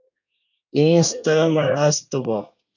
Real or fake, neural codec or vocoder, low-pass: fake; codec, 24 kHz, 1 kbps, SNAC; 7.2 kHz